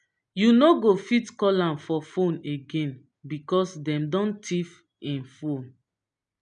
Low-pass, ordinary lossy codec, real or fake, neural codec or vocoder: 9.9 kHz; none; real; none